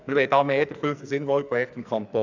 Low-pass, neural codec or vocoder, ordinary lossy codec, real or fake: 7.2 kHz; codec, 44.1 kHz, 2.6 kbps, SNAC; none; fake